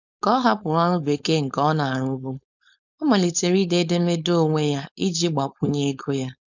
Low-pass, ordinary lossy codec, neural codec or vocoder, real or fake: 7.2 kHz; none; codec, 16 kHz, 4.8 kbps, FACodec; fake